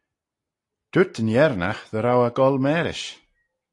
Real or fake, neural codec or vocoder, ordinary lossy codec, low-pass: real; none; AAC, 48 kbps; 10.8 kHz